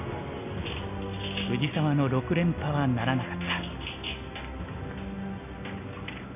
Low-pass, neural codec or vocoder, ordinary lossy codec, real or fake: 3.6 kHz; none; none; real